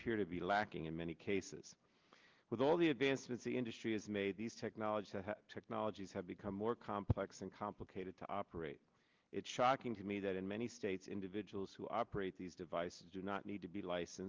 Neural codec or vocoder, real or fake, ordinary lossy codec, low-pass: none; real; Opus, 32 kbps; 7.2 kHz